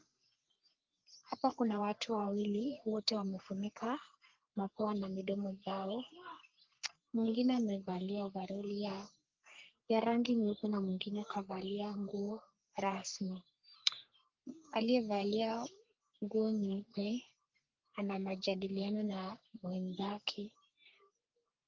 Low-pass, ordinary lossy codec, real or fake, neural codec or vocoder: 7.2 kHz; Opus, 32 kbps; fake; codec, 44.1 kHz, 3.4 kbps, Pupu-Codec